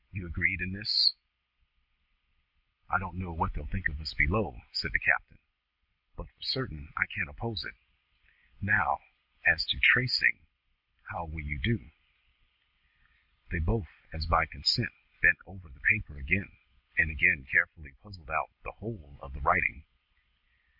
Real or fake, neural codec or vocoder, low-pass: real; none; 5.4 kHz